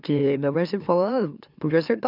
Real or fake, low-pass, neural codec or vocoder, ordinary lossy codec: fake; 5.4 kHz; autoencoder, 44.1 kHz, a latent of 192 numbers a frame, MeloTTS; none